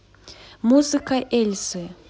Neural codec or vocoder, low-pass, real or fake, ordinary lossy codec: none; none; real; none